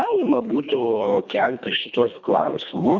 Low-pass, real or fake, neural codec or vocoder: 7.2 kHz; fake; codec, 24 kHz, 1.5 kbps, HILCodec